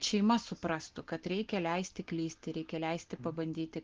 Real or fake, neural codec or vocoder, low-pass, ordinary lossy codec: real; none; 7.2 kHz; Opus, 16 kbps